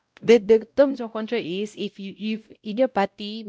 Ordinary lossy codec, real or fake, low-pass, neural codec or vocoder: none; fake; none; codec, 16 kHz, 0.5 kbps, X-Codec, WavLM features, trained on Multilingual LibriSpeech